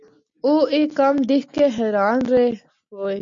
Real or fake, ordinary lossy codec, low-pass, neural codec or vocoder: real; MP3, 64 kbps; 7.2 kHz; none